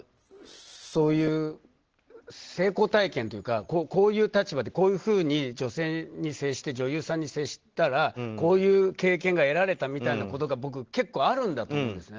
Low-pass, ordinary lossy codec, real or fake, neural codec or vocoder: 7.2 kHz; Opus, 16 kbps; real; none